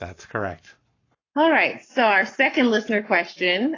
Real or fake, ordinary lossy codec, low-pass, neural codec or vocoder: fake; AAC, 32 kbps; 7.2 kHz; codec, 44.1 kHz, 7.8 kbps, Pupu-Codec